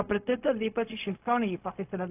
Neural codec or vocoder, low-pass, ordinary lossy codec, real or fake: codec, 16 kHz, 0.4 kbps, LongCat-Audio-Codec; 3.6 kHz; AAC, 32 kbps; fake